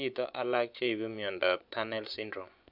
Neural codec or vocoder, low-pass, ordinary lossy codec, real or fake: vocoder, 24 kHz, 100 mel bands, Vocos; 5.4 kHz; none; fake